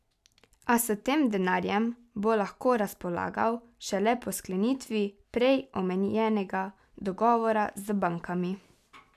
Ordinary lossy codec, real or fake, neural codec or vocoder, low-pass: none; real; none; 14.4 kHz